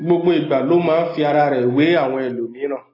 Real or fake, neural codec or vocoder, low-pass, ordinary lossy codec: real; none; 5.4 kHz; AAC, 24 kbps